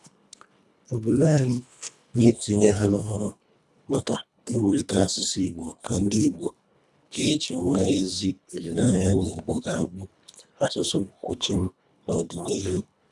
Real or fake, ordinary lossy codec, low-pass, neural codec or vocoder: fake; none; none; codec, 24 kHz, 1.5 kbps, HILCodec